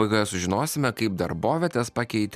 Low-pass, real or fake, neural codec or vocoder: 14.4 kHz; real; none